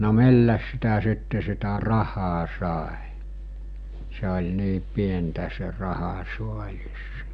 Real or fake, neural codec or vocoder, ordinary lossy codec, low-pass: real; none; none; 9.9 kHz